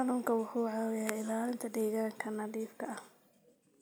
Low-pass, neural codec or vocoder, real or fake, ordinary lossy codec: none; none; real; none